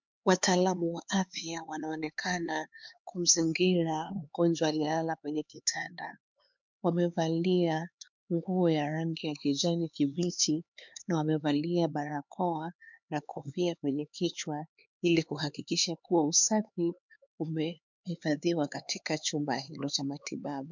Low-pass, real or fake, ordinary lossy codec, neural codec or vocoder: 7.2 kHz; fake; MP3, 64 kbps; codec, 16 kHz, 4 kbps, X-Codec, HuBERT features, trained on LibriSpeech